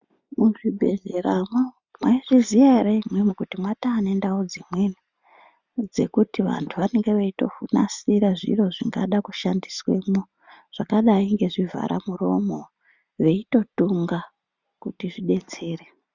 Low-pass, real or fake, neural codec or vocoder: 7.2 kHz; real; none